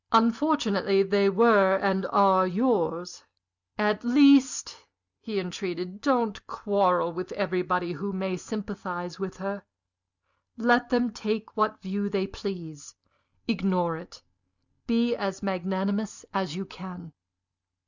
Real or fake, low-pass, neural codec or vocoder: real; 7.2 kHz; none